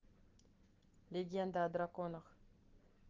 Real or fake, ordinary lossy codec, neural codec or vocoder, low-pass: real; Opus, 16 kbps; none; 7.2 kHz